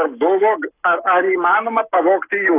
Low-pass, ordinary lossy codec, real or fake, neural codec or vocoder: 3.6 kHz; AAC, 24 kbps; fake; codec, 44.1 kHz, 7.8 kbps, Pupu-Codec